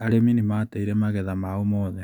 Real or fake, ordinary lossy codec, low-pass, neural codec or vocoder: real; none; 19.8 kHz; none